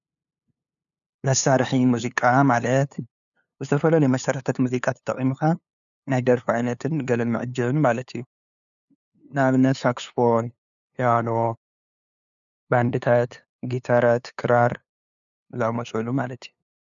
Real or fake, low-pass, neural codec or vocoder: fake; 7.2 kHz; codec, 16 kHz, 2 kbps, FunCodec, trained on LibriTTS, 25 frames a second